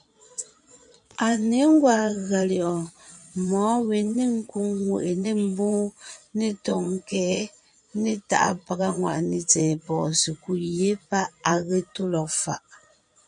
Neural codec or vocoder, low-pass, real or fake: vocoder, 22.05 kHz, 80 mel bands, Vocos; 9.9 kHz; fake